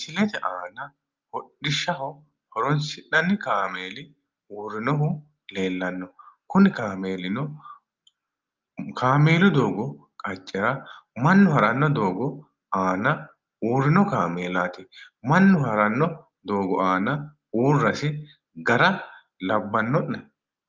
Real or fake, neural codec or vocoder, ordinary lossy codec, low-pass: real; none; Opus, 32 kbps; 7.2 kHz